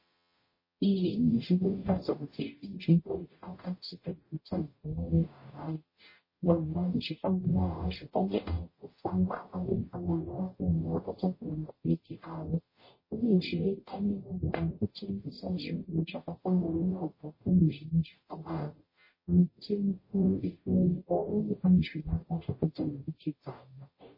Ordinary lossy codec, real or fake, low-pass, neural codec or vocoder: MP3, 24 kbps; fake; 5.4 kHz; codec, 44.1 kHz, 0.9 kbps, DAC